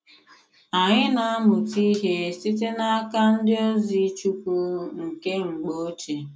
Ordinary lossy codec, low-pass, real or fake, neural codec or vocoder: none; none; real; none